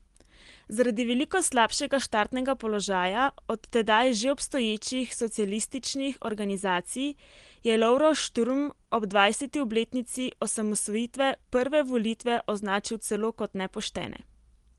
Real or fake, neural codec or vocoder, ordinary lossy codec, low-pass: real; none; Opus, 24 kbps; 10.8 kHz